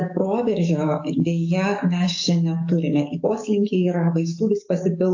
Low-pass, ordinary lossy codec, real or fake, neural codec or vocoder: 7.2 kHz; AAC, 48 kbps; fake; codec, 44.1 kHz, 7.8 kbps, DAC